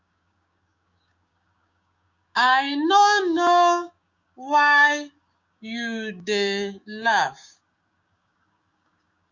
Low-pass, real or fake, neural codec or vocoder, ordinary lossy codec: 7.2 kHz; fake; codec, 16 kHz, 6 kbps, DAC; Opus, 64 kbps